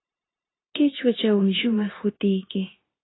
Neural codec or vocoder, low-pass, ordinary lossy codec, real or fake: codec, 16 kHz, 0.9 kbps, LongCat-Audio-Codec; 7.2 kHz; AAC, 16 kbps; fake